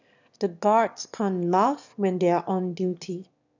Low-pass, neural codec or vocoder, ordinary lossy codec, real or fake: 7.2 kHz; autoencoder, 22.05 kHz, a latent of 192 numbers a frame, VITS, trained on one speaker; none; fake